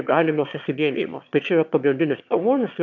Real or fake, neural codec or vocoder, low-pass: fake; autoencoder, 22.05 kHz, a latent of 192 numbers a frame, VITS, trained on one speaker; 7.2 kHz